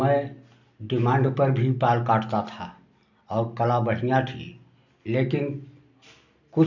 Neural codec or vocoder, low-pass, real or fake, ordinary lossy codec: none; 7.2 kHz; real; none